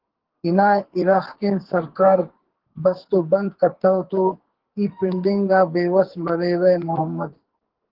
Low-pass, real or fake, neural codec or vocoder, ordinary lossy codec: 5.4 kHz; fake; codec, 44.1 kHz, 2.6 kbps, SNAC; Opus, 16 kbps